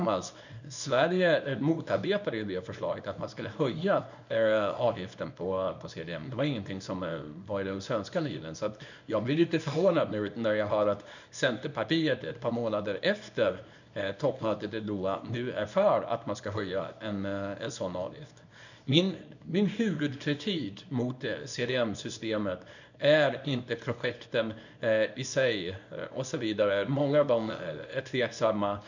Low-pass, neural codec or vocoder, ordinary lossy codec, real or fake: 7.2 kHz; codec, 24 kHz, 0.9 kbps, WavTokenizer, small release; none; fake